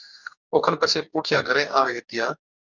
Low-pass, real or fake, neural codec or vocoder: 7.2 kHz; fake; codec, 44.1 kHz, 2.6 kbps, DAC